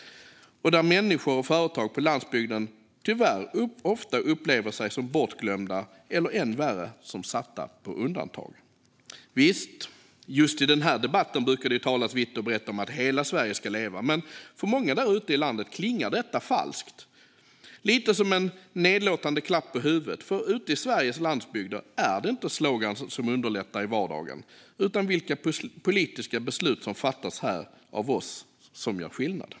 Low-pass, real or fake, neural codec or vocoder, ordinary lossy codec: none; real; none; none